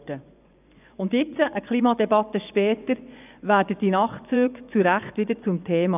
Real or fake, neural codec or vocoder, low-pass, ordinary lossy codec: fake; vocoder, 24 kHz, 100 mel bands, Vocos; 3.6 kHz; none